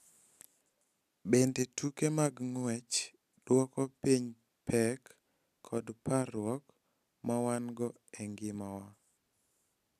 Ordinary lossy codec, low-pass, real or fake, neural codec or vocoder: none; 14.4 kHz; real; none